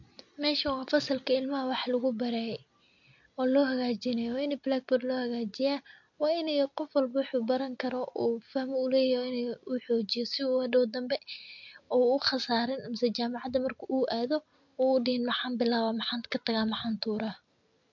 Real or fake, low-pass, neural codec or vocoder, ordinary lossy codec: real; 7.2 kHz; none; MP3, 48 kbps